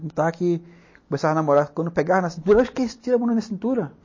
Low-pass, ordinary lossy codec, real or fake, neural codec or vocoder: 7.2 kHz; MP3, 32 kbps; real; none